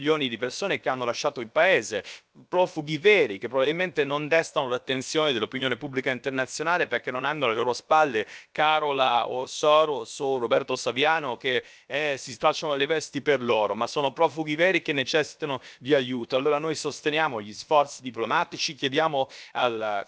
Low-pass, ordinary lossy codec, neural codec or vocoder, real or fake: none; none; codec, 16 kHz, about 1 kbps, DyCAST, with the encoder's durations; fake